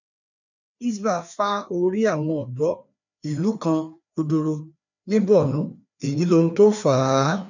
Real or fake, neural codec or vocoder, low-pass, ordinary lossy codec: fake; codec, 16 kHz, 2 kbps, FreqCodec, larger model; 7.2 kHz; none